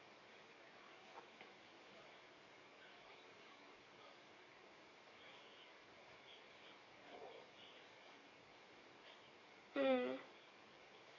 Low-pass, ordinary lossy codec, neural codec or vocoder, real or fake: 7.2 kHz; none; codec, 44.1 kHz, 7.8 kbps, DAC; fake